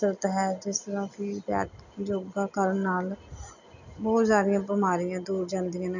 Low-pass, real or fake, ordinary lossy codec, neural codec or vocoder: 7.2 kHz; real; none; none